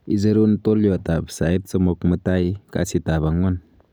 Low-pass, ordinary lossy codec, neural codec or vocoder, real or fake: none; none; none; real